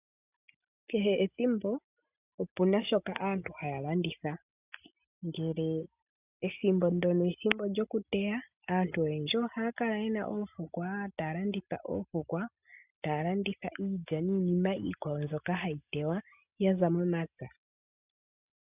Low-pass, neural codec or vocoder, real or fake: 3.6 kHz; none; real